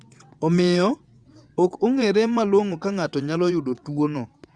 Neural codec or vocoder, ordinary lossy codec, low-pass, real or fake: vocoder, 22.05 kHz, 80 mel bands, WaveNeXt; Opus, 64 kbps; 9.9 kHz; fake